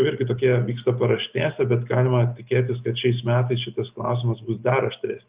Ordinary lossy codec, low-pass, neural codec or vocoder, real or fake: Opus, 24 kbps; 3.6 kHz; none; real